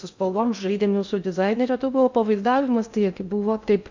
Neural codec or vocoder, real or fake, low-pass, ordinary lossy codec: codec, 16 kHz in and 24 kHz out, 0.6 kbps, FocalCodec, streaming, 2048 codes; fake; 7.2 kHz; MP3, 64 kbps